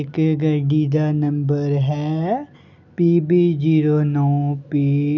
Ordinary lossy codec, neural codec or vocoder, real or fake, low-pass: none; none; real; 7.2 kHz